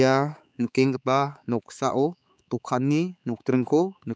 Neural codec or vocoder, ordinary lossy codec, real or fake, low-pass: codec, 16 kHz, 4 kbps, X-Codec, HuBERT features, trained on balanced general audio; none; fake; none